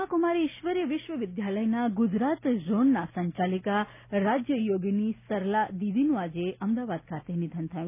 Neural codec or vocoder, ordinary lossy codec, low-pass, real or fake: none; none; 3.6 kHz; real